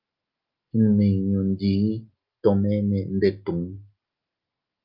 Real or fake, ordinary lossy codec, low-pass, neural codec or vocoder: real; Opus, 24 kbps; 5.4 kHz; none